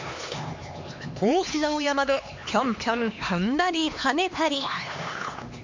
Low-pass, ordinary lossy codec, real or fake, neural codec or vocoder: 7.2 kHz; MP3, 48 kbps; fake; codec, 16 kHz, 2 kbps, X-Codec, HuBERT features, trained on LibriSpeech